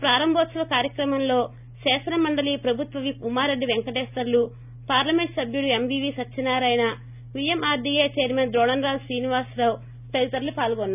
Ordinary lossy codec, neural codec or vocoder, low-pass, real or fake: none; none; 3.6 kHz; real